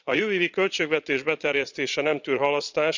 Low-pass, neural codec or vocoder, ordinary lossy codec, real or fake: 7.2 kHz; vocoder, 22.05 kHz, 80 mel bands, Vocos; none; fake